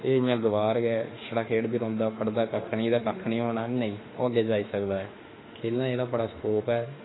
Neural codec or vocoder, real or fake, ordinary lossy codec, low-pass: autoencoder, 48 kHz, 32 numbers a frame, DAC-VAE, trained on Japanese speech; fake; AAC, 16 kbps; 7.2 kHz